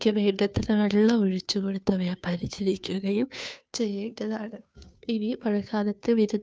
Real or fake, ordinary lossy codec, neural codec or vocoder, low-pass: fake; none; codec, 16 kHz, 2 kbps, FunCodec, trained on Chinese and English, 25 frames a second; none